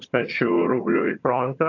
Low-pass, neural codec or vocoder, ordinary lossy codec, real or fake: 7.2 kHz; vocoder, 22.05 kHz, 80 mel bands, HiFi-GAN; AAC, 48 kbps; fake